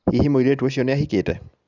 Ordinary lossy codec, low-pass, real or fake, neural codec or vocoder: none; 7.2 kHz; real; none